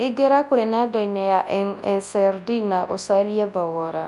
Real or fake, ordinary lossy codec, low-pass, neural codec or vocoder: fake; MP3, 96 kbps; 10.8 kHz; codec, 24 kHz, 0.9 kbps, WavTokenizer, large speech release